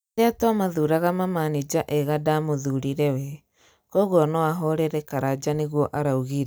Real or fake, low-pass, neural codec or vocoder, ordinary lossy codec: real; none; none; none